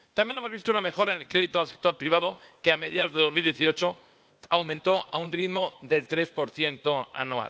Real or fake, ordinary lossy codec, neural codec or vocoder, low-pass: fake; none; codec, 16 kHz, 0.8 kbps, ZipCodec; none